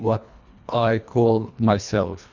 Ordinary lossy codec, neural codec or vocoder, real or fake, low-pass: Opus, 64 kbps; codec, 24 kHz, 1.5 kbps, HILCodec; fake; 7.2 kHz